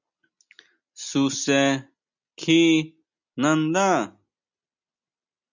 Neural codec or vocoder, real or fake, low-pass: none; real; 7.2 kHz